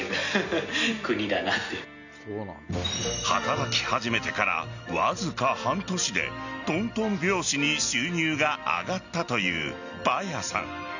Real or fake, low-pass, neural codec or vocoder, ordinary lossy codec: real; 7.2 kHz; none; none